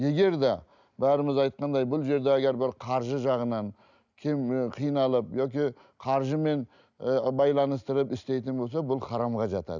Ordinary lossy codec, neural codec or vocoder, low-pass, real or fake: none; none; 7.2 kHz; real